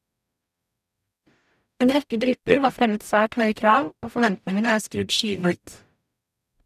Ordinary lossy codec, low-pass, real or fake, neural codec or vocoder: none; 14.4 kHz; fake; codec, 44.1 kHz, 0.9 kbps, DAC